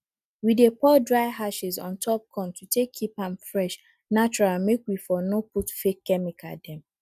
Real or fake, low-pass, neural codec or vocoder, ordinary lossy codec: real; 14.4 kHz; none; none